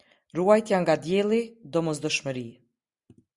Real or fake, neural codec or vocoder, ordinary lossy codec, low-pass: real; none; Opus, 64 kbps; 10.8 kHz